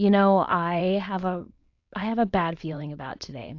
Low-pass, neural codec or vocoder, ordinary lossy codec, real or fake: 7.2 kHz; codec, 16 kHz, 4.8 kbps, FACodec; AAC, 48 kbps; fake